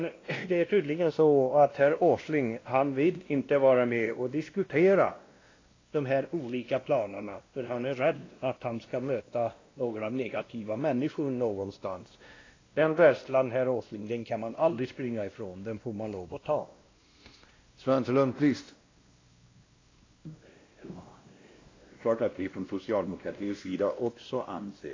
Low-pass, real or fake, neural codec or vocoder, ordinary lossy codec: 7.2 kHz; fake; codec, 16 kHz, 1 kbps, X-Codec, WavLM features, trained on Multilingual LibriSpeech; AAC, 32 kbps